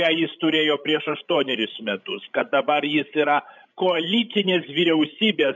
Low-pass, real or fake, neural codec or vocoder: 7.2 kHz; fake; codec, 16 kHz, 16 kbps, FreqCodec, larger model